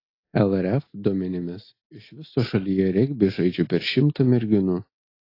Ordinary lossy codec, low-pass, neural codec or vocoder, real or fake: AAC, 32 kbps; 5.4 kHz; none; real